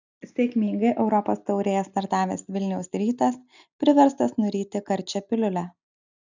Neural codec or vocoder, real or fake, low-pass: none; real; 7.2 kHz